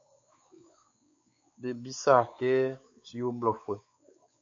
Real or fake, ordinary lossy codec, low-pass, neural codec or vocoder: fake; MP3, 48 kbps; 7.2 kHz; codec, 16 kHz, 4 kbps, X-Codec, WavLM features, trained on Multilingual LibriSpeech